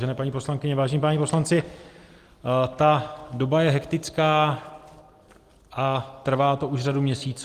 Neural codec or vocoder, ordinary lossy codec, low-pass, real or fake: none; Opus, 24 kbps; 14.4 kHz; real